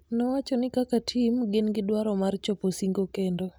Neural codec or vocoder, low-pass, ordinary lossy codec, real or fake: none; none; none; real